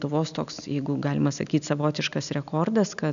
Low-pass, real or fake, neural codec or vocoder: 7.2 kHz; real; none